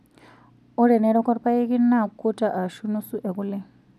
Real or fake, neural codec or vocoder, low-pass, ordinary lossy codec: real; none; 14.4 kHz; none